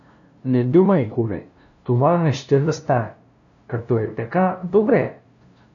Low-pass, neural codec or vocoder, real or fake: 7.2 kHz; codec, 16 kHz, 0.5 kbps, FunCodec, trained on LibriTTS, 25 frames a second; fake